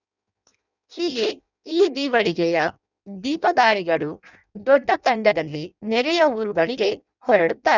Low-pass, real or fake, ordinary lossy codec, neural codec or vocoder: 7.2 kHz; fake; none; codec, 16 kHz in and 24 kHz out, 0.6 kbps, FireRedTTS-2 codec